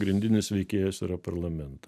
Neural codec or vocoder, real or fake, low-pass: none; real; 14.4 kHz